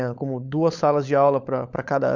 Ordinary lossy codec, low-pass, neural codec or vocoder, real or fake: none; 7.2 kHz; codec, 16 kHz, 16 kbps, FunCodec, trained on LibriTTS, 50 frames a second; fake